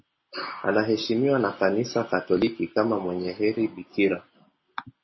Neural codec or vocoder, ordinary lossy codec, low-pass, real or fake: none; MP3, 24 kbps; 7.2 kHz; real